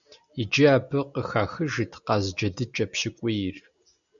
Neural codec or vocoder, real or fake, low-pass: none; real; 7.2 kHz